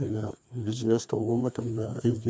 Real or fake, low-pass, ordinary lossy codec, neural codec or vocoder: fake; none; none; codec, 16 kHz, 2 kbps, FreqCodec, larger model